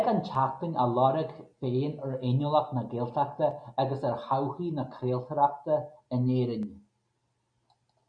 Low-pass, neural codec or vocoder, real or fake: 9.9 kHz; none; real